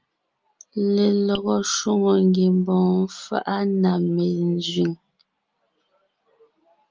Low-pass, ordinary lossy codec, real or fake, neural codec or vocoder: 7.2 kHz; Opus, 24 kbps; real; none